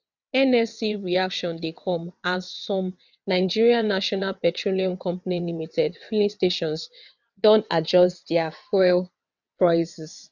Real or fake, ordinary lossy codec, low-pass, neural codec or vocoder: fake; none; 7.2 kHz; vocoder, 22.05 kHz, 80 mel bands, WaveNeXt